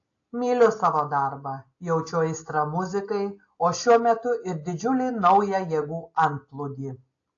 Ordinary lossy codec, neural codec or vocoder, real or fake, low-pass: AAC, 48 kbps; none; real; 7.2 kHz